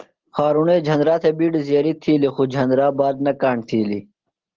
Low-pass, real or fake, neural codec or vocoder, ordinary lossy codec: 7.2 kHz; real; none; Opus, 16 kbps